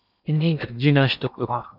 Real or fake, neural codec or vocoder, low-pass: fake; codec, 16 kHz in and 24 kHz out, 0.8 kbps, FocalCodec, streaming, 65536 codes; 5.4 kHz